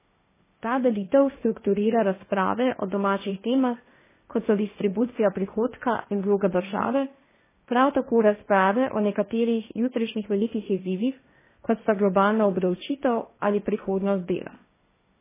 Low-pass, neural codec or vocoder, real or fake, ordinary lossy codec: 3.6 kHz; codec, 16 kHz, 1.1 kbps, Voila-Tokenizer; fake; MP3, 16 kbps